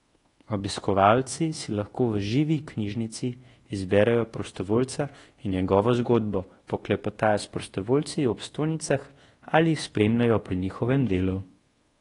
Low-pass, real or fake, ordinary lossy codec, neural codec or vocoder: 10.8 kHz; fake; AAC, 32 kbps; codec, 24 kHz, 1.2 kbps, DualCodec